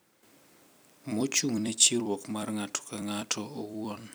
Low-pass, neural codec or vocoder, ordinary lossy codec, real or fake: none; vocoder, 44.1 kHz, 128 mel bands every 256 samples, BigVGAN v2; none; fake